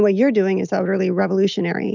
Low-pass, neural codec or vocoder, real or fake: 7.2 kHz; none; real